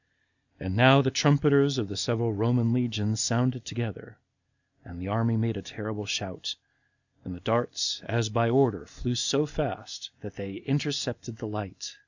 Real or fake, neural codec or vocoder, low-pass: real; none; 7.2 kHz